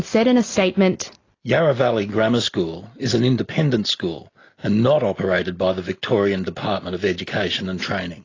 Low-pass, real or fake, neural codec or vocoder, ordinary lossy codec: 7.2 kHz; real; none; AAC, 32 kbps